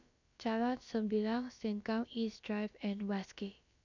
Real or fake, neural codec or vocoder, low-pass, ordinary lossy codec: fake; codec, 16 kHz, about 1 kbps, DyCAST, with the encoder's durations; 7.2 kHz; none